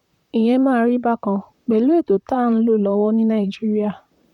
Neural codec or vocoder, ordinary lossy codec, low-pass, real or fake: vocoder, 44.1 kHz, 128 mel bands, Pupu-Vocoder; none; 19.8 kHz; fake